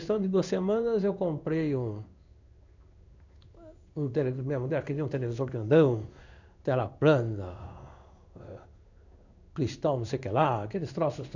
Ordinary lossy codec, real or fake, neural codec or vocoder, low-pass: none; fake; codec, 16 kHz in and 24 kHz out, 1 kbps, XY-Tokenizer; 7.2 kHz